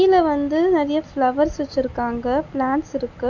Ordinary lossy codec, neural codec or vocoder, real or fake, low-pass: none; none; real; 7.2 kHz